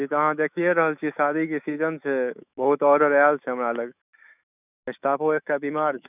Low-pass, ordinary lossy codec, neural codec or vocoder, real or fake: 3.6 kHz; none; autoencoder, 48 kHz, 128 numbers a frame, DAC-VAE, trained on Japanese speech; fake